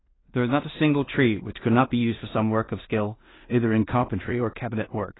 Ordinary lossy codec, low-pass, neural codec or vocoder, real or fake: AAC, 16 kbps; 7.2 kHz; codec, 16 kHz in and 24 kHz out, 0.4 kbps, LongCat-Audio-Codec, two codebook decoder; fake